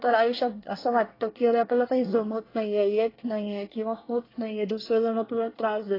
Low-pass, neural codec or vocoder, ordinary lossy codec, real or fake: 5.4 kHz; codec, 24 kHz, 1 kbps, SNAC; AAC, 24 kbps; fake